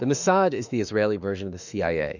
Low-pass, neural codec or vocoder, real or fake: 7.2 kHz; autoencoder, 48 kHz, 32 numbers a frame, DAC-VAE, trained on Japanese speech; fake